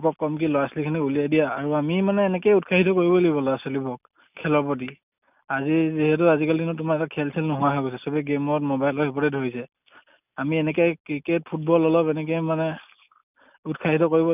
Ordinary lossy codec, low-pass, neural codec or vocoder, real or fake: none; 3.6 kHz; none; real